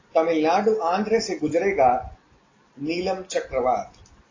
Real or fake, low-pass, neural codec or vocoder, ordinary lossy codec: real; 7.2 kHz; none; AAC, 32 kbps